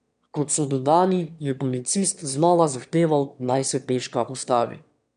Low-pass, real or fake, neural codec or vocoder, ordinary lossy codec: 9.9 kHz; fake; autoencoder, 22.05 kHz, a latent of 192 numbers a frame, VITS, trained on one speaker; none